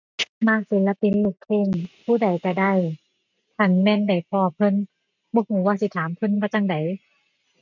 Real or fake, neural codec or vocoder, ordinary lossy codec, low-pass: real; none; none; 7.2 kHz